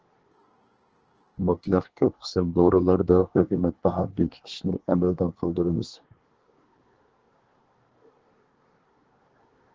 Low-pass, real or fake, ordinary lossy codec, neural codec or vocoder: 7.2 kHz; fake; Opus, 16 kbps; codec, 24 kHz, 1 kbps, SNAC